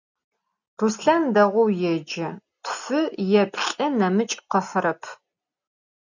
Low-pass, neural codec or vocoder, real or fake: 7.2 kHz; none; real